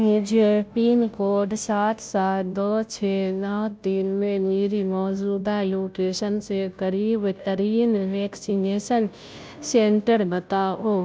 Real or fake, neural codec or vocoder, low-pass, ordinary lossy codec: fake; codec, 16 kHz, 0.5 kbps, FunCodec, trained on Chinese and English, 25 frames a second; none; none